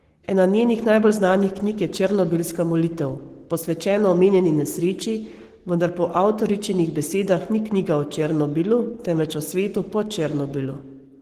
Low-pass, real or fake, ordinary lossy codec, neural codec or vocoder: 14.4 kHz; fake; Opus, 16 kbps; codec, 44.1 kHz, 7.8 kbps, Pupu-Codec